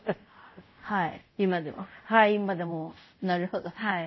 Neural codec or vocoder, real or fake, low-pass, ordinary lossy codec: codec, 24 kHz, 0.5 kbps, DualCodec; fake; 7.2 kHz; MP3, 24 kbps